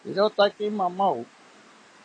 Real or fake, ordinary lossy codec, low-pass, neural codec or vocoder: real; AAC, 32 kbps; 9.9 kHz; none